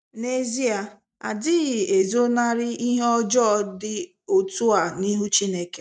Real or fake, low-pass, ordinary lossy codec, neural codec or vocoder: real; 9.9 kHz; none; none